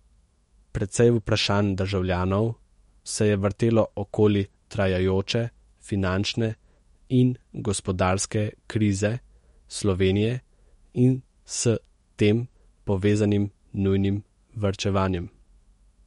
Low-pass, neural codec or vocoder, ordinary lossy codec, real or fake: 19.8 kHz; autoencoder, 48 kHz, 128 numbers a frame, DAC-VAE, trained on Japanese speech; MP3, 48 kbps; fake